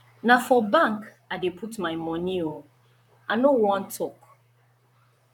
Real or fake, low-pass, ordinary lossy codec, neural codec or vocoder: fake; 19.8 kHz; none; vocoder, 44.1 kHz, 128 mel bands, Pupu-Vocoder